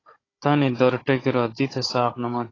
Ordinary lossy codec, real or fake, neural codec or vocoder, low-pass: AAC, 32 kbps; fake; codec, 16 kHz, 16 kbps, FunCodec, trained on Chinese and English, 50 frames a second; 7.2 kHz